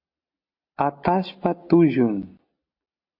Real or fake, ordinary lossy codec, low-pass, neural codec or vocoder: real; MP3, 32 kbps; 5.4 kHz; none